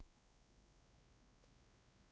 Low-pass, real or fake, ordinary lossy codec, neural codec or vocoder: none; fake; none; codec, 16 kHz, 2 kbps, X-Codec, WavLM features, trained on Multilingual LibriSpeech